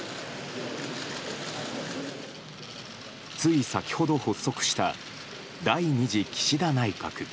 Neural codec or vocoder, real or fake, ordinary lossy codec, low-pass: none; real; none; none